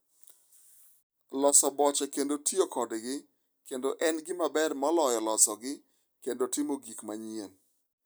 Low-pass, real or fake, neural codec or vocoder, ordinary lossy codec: none; real; none; none